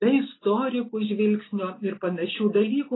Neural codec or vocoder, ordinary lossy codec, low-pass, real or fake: none; AAC, 16 kbps; 7.2 kHz; real